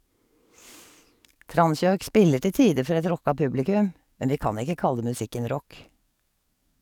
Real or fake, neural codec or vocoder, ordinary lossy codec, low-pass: fake; codec, 44.1 kHz, 7.8 kbps, DAC; none; 19.8 kHz